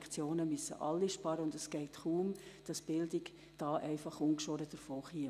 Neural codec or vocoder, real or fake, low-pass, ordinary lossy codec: none; real; 14.4 kHz; none